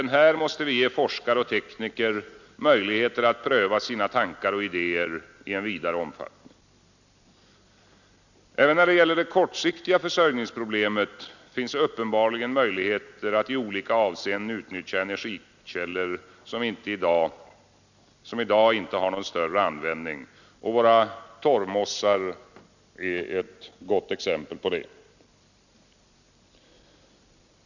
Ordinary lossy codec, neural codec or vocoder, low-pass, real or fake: none; none; 7.2 kHz; real